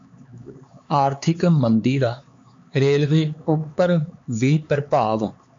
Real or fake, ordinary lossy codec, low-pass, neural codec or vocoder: fake; MP3, 48 kbps; 7.2 kHz; codec, 16 kHz, 4 kbps, X-Codec, HuBERT features, trained on LibriSpeech